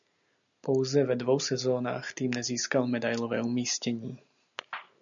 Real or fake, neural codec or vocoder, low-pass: real; none; 7.2 kHz